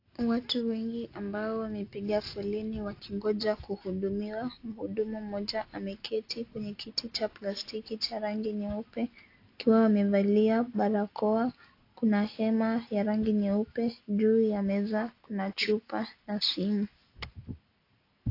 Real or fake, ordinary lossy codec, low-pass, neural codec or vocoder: real; AAC, 32 kbps; 5.4 kHz; none